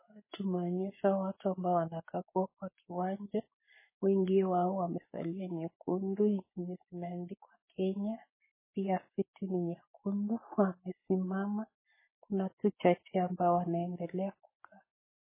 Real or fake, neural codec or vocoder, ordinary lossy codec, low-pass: fake; codec, 44.1 kHz, 7.8 kbps, Pupu-Codec; MP3, 16 kbps; 3.6 kHz